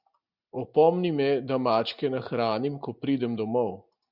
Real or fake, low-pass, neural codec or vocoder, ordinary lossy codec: real; 5.4 kHz; none; Opus, 64 kbps